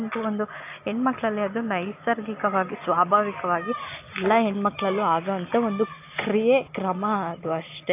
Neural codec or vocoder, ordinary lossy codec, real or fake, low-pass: none; AAC, 24 kbps; real; 3.6 kHz